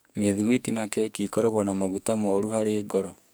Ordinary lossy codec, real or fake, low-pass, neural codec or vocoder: none; fake; none; codec, 44.1 kHz, 2.6 kbps, SNAC